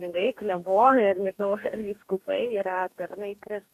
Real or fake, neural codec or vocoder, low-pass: fake; codec, 44.1 kHz, 2.6 kbps, DAC; 14.4 kHz